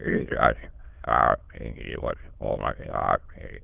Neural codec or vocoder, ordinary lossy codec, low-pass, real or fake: autoencoder, 22.05 kHz, a latent of 192 numbers a frame, VITS, trained on many speakers; Opus, 32 kbps; 3.6 kHz; fake